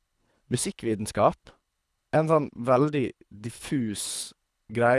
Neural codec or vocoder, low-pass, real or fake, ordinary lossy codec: codec, 24 kHz, 3 kbps, HILCodec; none; fake; none